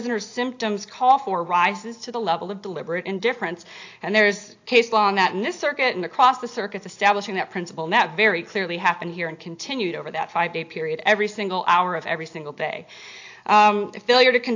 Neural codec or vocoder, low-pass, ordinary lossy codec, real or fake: none; 7.2 kHz; AAC, 48 kbps; real